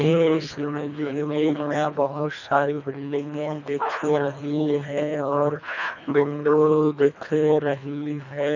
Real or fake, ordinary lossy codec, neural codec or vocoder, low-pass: fake; none; codec, 24 kHz, 1.5 kbps, HILCodec; 7.2 kHz